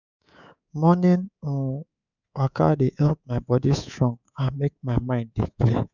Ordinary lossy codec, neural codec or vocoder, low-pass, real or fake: none; codec, 24 kHz, 3.1 kbps, DualCodec; 7.2 kHz; fake